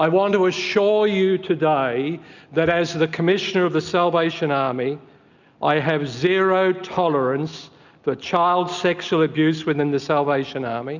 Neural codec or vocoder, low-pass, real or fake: none; 7.2 kHz; real